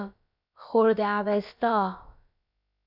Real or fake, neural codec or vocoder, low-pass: fake; codec, 16 kHz, about 1 kbps, DyCAST, with the encoder's durations; 5.4 kHz